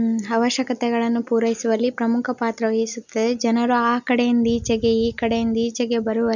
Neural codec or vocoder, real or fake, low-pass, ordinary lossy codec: none; real; 7.2 kHz; none